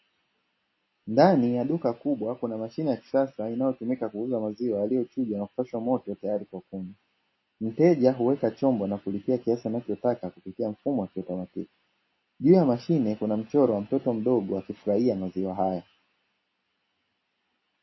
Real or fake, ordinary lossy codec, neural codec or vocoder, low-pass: real; MP3, 24 kbps; none; 7.2 kHz